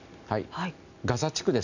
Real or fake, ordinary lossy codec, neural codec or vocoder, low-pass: real; none; none; 7.2 kHz